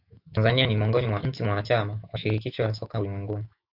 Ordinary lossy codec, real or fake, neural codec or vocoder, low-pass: AAC, 48 kbps; fake; autoencoder, 48 kHz, 128 numbers a frame, DAC-VAE, trained on Japanese speech; 5.4 kHz